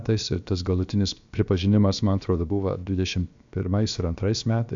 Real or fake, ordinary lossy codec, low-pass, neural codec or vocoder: fake; AAC, 64 kbps; 7.2 kHz; codec, 16 kHz, about 1 kbps, DyCAST, with the encoder's durations